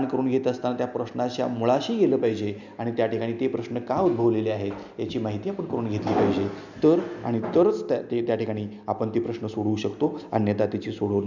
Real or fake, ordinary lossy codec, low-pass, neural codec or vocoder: real; none; 7.2 kHz; none